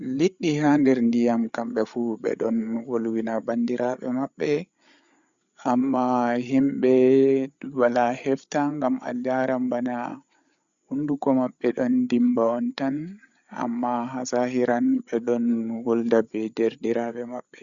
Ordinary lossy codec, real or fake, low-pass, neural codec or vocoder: Opus, 64 kbps; fake; 7.2 kHz; codec, 16 kHz, 8 kbps, FreqCodec, larger model